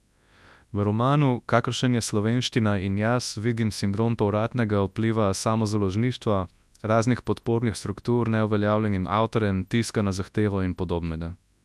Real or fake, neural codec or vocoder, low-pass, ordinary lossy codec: fake; codec, 24 kHz, 0.9 kbps, WavTokenizer, large speech release; none; none